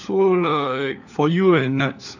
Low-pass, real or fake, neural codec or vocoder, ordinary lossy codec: 7.2 kHz; fake; codec, 16 kHz, 4 kbps, FunCodec, trained on LibriTTS, 50 frames a second; none